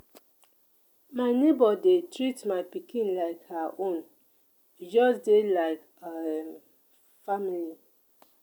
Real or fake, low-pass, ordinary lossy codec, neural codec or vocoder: real; none; none; none